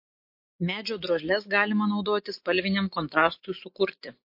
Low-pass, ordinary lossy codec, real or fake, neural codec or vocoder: 5.4 kHz; MP3, 32 kbps; real; none